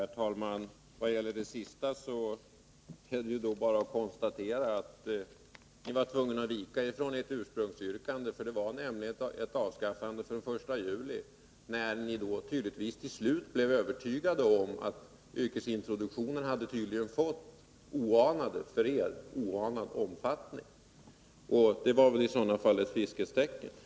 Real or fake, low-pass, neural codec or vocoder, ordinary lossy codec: real; none; none; none